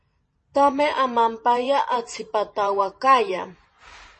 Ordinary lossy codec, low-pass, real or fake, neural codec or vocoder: MP3, 32 kbps; 10.8 kHz; fake; vocoder, 44.1 kHz, 128 mel bands every 512 samples, BigVGAN v2